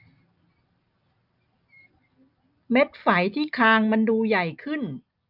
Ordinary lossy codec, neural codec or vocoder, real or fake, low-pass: none; none; real; 5.4 kHz